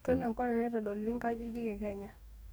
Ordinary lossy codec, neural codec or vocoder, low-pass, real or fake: none; codec, 44.1 kHz, 2.6 kbps, DAC; none; fake